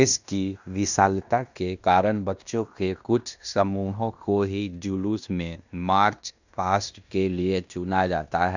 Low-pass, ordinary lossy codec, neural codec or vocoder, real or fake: 7.2 kHz; none; codec, 16 kHz in and 24 kHz out, 0.9 kbps, LongCat-Audio-Codec, fine tuned four codebook decoder; fake